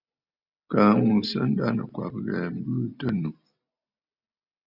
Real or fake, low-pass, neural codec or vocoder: real; 5.4 kHz; none